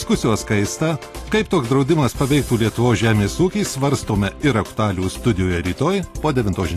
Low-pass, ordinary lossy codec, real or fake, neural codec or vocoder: 14.4 kHz; AAC, 48 kbps; real; none